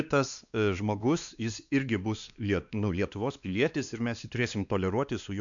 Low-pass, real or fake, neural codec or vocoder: 7.2 kHz; fake; codec, 16 kHz, 2 kbps, X-Codec, WavLM features, trained on Multilingual LibriSpeech